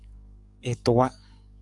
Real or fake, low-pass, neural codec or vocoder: fake; 10.8 kHz; codec, 44.1 kHz, 7.8 kbps, DAC